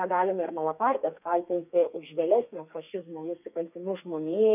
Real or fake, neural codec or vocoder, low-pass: fake; codec, 32 kHz, 1.9 kbps, SNAC; 3.6 kHz